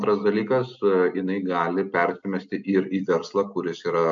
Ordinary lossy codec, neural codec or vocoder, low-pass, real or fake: MP3, 64 kbps; none; 7.2 kHz; real